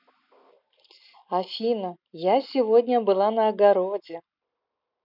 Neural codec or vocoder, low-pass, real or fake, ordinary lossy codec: none; 5.4 kHz; real; none